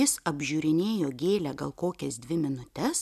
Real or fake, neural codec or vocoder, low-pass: real; none; 14.4 kHz